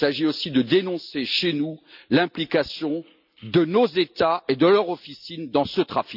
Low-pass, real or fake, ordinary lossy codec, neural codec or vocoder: 5.4 kHz; real; none; none